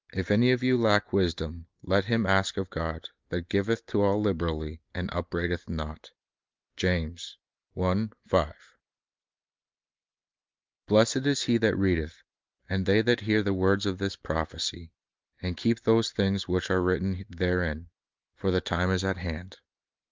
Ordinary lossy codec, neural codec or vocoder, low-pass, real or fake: Opus, 32 kbps; none; 7.2 kHz; real